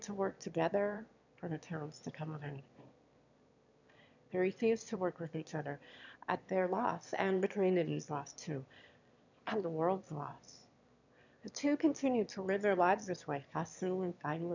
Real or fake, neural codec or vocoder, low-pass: fake; autoencoder, 22.05 kHz, a latent of 192 numbers a frame, VITS, trained on one speaker; 7.2 kHz